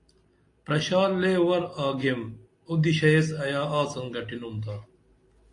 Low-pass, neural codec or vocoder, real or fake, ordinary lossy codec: 10.8 kHz; none; real; AAC, 48 kbps